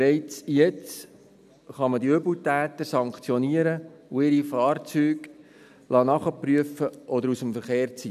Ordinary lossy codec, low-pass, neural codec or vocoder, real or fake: none; 14.4 kHz; none; real